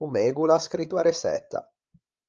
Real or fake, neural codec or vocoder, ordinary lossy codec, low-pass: fake; codec, 16 kHz, 16 kbps, FreqCodec, larger model; Opus, 24 kbps; 7.2 kHz